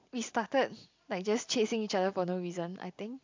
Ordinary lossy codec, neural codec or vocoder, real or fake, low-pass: MP3, 64 kbps; none; real; 7.2 kHz